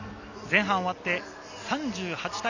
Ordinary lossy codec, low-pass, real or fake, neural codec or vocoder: Opus, 64 kbps; 7.2 kHz; real; none